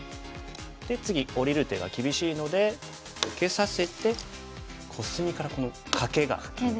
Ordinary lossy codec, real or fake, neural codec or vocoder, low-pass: none; real; none; none